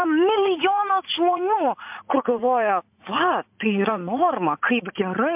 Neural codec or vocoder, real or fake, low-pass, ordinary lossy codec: vocoder, 44.1 kHz, 80 mel bands, Vocos; fake; 3.6 kHz; MP3, 32 kbps